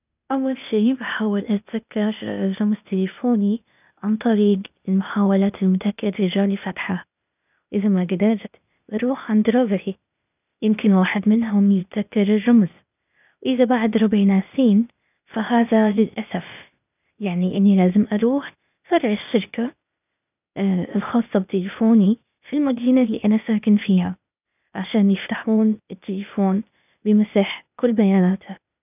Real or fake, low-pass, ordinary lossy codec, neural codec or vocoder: fake; 3.6 kHz; none; codec, 16 kHz, 0.8 kbps, ZipCodec